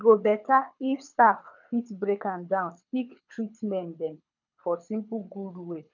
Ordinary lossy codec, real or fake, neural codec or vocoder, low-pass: none; fake; codec, 24 kHz, 6 kbps, HILCodec; 7.2 kHz